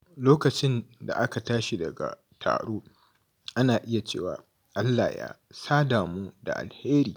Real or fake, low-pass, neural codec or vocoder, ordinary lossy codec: real; none; none; none